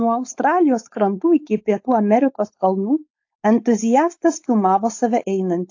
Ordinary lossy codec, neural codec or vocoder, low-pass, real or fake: AAC, 48 kbps; codec, 16 kHz, 4.8 kbps, FACodec; 7.2 kHz; fake